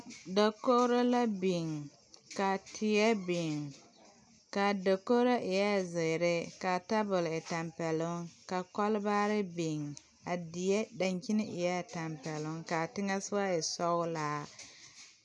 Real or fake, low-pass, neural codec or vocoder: real; 10.8 kHz; none